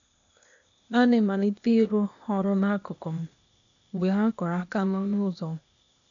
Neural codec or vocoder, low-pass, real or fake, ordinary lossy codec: codec, 16 kHz, 0.8 kbps, ZipCodec; 7.2 kHz; fake; none